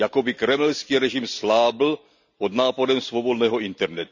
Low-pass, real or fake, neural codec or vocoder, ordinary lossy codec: 7.2 kHz; real; none; none